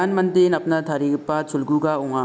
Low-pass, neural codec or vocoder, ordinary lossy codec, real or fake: none; none; none; real